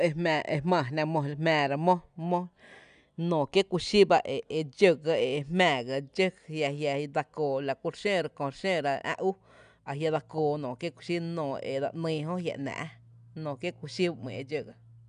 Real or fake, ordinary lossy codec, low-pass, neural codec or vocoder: real; none; 9.9 kHz; none